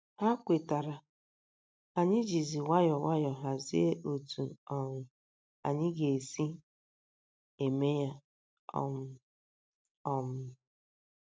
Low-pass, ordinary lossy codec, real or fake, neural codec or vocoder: none; none; real; none